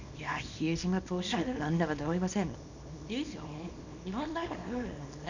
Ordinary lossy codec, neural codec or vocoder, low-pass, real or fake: none; codec, 24 kHz, 0.9 kbps, WavTokenizer, small release; 7.2 kHz; fake